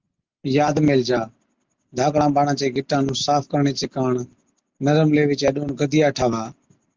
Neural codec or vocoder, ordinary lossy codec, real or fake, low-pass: none; Opus, 32 kbps; real; 7.2 kHz